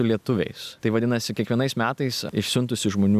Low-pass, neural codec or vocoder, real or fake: 14.4 kHz; none; real